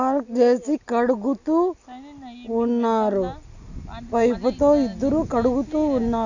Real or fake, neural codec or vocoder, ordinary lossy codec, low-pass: real; none; none; 7.2 kHz